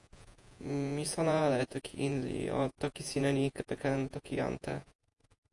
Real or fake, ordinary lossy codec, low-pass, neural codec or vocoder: fake; AAC, 64 kbps; 10.8 kHz; vocoder, 48 kHz, 128 mel bands, Vocos